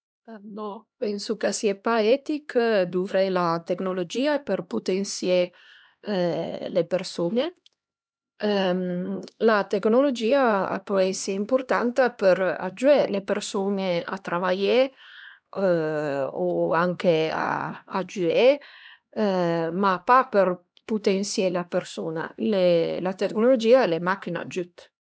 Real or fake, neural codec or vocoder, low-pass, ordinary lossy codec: fake; codec, 16 kHz, 2 kbps, X-Codec, HuBERT features, trained on LibriSpeech; none; none